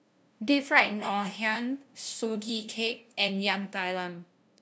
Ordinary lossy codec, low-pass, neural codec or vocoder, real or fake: none; none; codec, 16 kHz, 0.5 kbps, FunCodec, trained on LibriTTS, 25 frames a second; fake